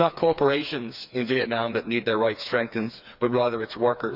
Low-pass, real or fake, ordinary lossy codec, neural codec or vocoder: 5.4 kHz; fake; none; codec, 16 kHz, 4 kbps, FreqCodec, smaller model